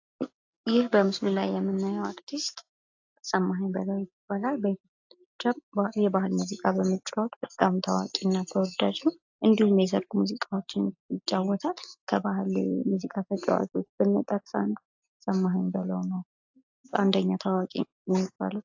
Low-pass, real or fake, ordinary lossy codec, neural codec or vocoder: 7.2 kHz; real; AAC, 48 kbps; none